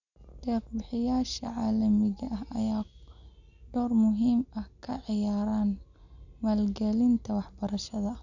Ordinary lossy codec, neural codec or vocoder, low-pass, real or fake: none; none; 7.2 kHz; real